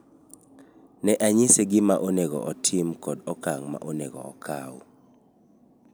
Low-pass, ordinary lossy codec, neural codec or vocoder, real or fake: none; none; none; real